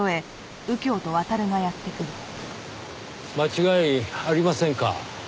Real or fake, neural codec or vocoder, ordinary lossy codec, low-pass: real; none; none; none